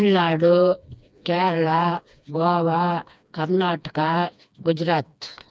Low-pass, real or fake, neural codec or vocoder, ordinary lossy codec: none; fake; codec, 16 kHz, 2 kbps, FreqCodec, smaller model; none